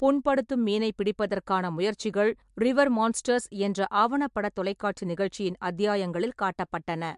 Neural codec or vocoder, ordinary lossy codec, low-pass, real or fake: none; MP3, 64 kbps; 10.8 kHz; real